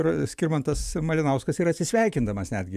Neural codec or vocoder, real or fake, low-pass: vocoder, 44.1 kHz, 128 mel bands every 512 samples, BigVGAN v2; fake; 14.4 kHz